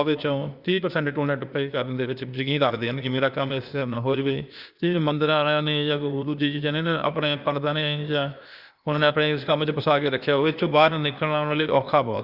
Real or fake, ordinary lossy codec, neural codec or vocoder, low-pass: fake; Opus, 64 kbps; codec, 16 kHz, 0.8 kbps, ZipCodec; 5.4 kHz